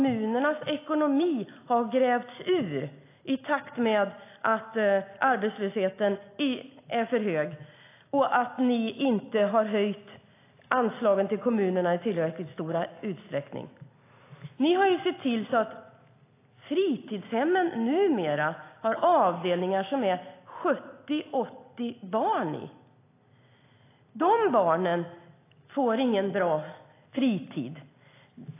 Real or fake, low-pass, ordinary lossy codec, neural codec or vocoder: real; 3.6 kHz; AAC, 24 kbps; none